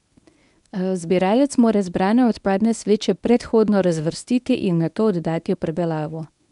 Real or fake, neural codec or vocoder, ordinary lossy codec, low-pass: fake; codec, 24 kHz, 0.9 kbps, WavTokenizer, medium speech release version 1; none; 10.8 kHz